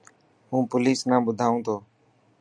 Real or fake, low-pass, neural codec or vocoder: real; 9.9 kHz; none